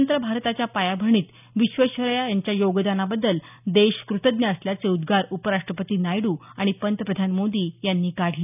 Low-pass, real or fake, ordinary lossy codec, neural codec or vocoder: 3.6 kHz; real; none; none